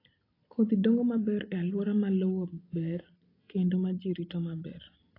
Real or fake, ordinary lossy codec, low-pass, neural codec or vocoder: real; AAC, 24 kbps; 5.4 kHz; none